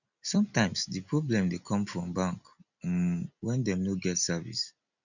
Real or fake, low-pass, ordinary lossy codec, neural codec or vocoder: real; 7.2 kHz; none; none